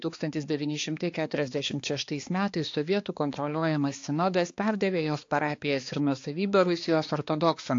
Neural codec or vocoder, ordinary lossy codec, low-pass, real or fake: codec, 16 kHz, 2 kbps, X-Codec, HuBERT features, trained on balanced general audio; AAC, 48 kbps; 7.2 kHz; fake